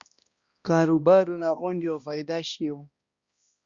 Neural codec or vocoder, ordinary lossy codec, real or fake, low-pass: codec, 16 kHz, 1 kbps, X-Codec, HuBERT features, trained on balanced general audio; Opus, 64 kbps; fake; 7.2 kHz